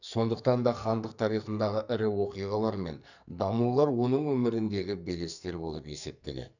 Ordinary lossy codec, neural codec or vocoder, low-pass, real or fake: none; codec, 44.1 kHz, 2.6 kbps, SNAC; 7.2 kHz; fake